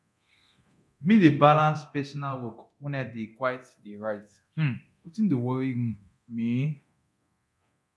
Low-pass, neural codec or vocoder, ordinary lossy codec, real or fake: none; codec, 24 kHz, 0.9 kbps, DualCodec; none; fake